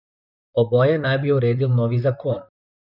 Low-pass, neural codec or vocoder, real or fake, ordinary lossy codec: 5.4 kHz; vocoder, 44.1 kHz, 128 mel bands, Pupu-Vocoder; fake; none